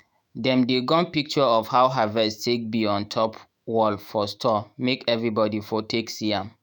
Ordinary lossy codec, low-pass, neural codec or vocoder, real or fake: none; none; autoencoder, 48 kHz, 128 numbers a frame, DAC-VAE, trained on Japanese speech; fake